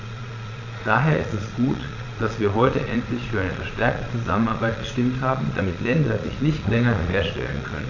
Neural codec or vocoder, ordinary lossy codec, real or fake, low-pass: vocoder, 22.05 kHz, 80 mel bands, Vocos; none; fake; 7.2 kHz